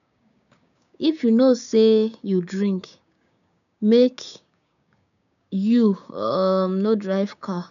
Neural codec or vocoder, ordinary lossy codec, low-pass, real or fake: codec, 16 kHz, 6 kbps, DAC; none; 7.2 kHz; fake